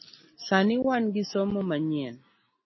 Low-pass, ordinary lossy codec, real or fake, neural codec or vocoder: 7.2 kHz; MP3, 24 kbps; real; none